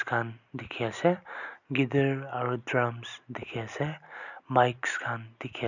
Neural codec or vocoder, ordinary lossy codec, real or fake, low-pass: none; none; real; 7.2 kHz